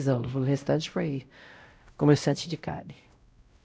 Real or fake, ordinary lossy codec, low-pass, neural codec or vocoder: fake; none; none; codec, 16 kHz, 0.5 kbps, X-Codec, WavLM features, trained on Multilingual LibriSpeech